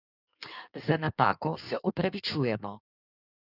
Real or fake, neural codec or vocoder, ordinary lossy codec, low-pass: fake; codec, 16 kHz in and 24 kHz out, 1.1 kbps, FireRedTTS-2 codec; none; 5.4 kHz